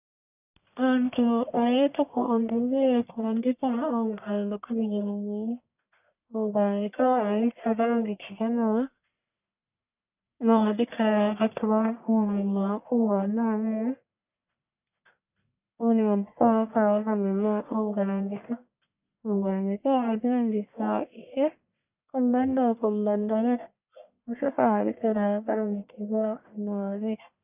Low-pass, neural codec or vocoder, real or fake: 3.6 kHz; codec, 44.1 kHz, 1.7 kbps, Pupu-Codec; fake